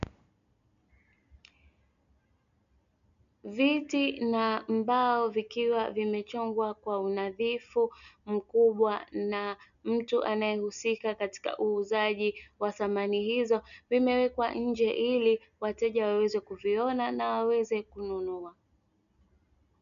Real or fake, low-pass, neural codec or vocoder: real; 7.2 kHz; none